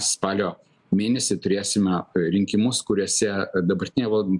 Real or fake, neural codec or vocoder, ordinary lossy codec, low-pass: real; none; MP3, 96 kbps; 10.8 kHz